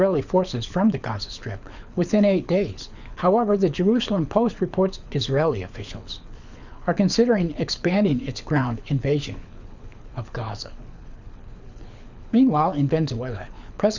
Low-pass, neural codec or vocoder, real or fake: 7.2 kHz; codec, 24 kHz, 6 kbps, HILCodec; fake